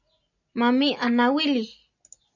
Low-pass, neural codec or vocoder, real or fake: 7.2 kHz; none; real